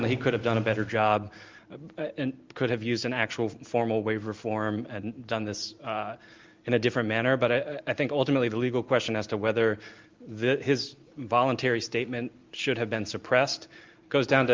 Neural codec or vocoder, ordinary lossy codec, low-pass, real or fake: none; Opus, 24 kbps; 7.2 kHz; real